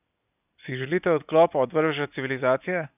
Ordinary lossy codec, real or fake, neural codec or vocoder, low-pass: none; real; none; 3.6 kHz